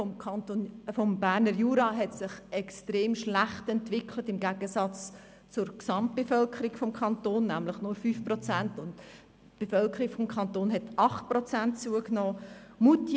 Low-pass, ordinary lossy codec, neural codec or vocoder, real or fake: none; none; none; real